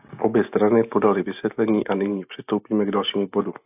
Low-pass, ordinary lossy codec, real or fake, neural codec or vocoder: 3.6 kHz; AAC, 32 kbps; real; none